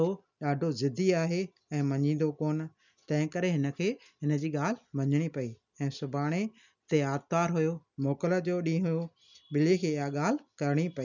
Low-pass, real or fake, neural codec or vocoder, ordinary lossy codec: 7.2 kHz; real; none; none